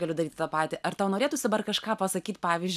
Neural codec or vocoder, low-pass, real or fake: none; 14.4 kHz; real